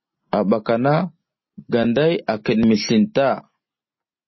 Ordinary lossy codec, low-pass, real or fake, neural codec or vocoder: MP3, 24 kbps; 7.2 kHz; real; none